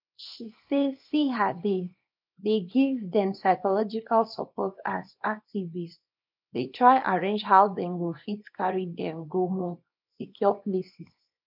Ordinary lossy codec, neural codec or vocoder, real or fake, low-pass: none; codec, 24 kHz, 0.9 kbps, WavTokenizer, small release; fake; 5.4 kHz